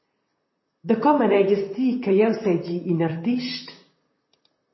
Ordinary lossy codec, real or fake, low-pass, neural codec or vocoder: MP3, 24 kbps; fake; 7.2 kHz; vocoder, 44.1 kHz, 128 mel bands, Pupu-Vocoder